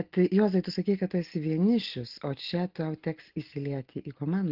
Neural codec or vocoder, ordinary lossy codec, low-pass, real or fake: none; Opus, 16 kbps; 5.4 kHz; real